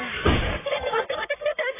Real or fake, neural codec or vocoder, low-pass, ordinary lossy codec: fake; codec, 44.1 kHz, 1.7 kbps, Pupu-Codec; 3.6 kHz; AAC, 16 kbps